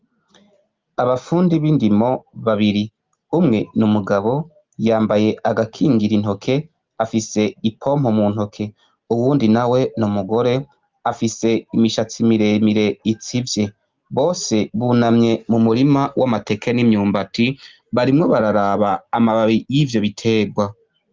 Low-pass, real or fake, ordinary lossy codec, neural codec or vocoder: 7.2 kHz; real; Opus, 32 kbps; none